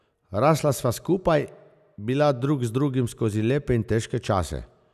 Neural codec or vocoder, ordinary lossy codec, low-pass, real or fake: none; none; 14.4 kHz; real